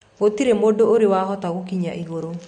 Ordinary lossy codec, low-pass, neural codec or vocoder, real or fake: MP3, 48 kbps; 9.9 kHz; none; real